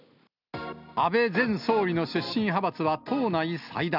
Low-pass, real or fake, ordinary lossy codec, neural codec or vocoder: 5.4 kHz; real; none; none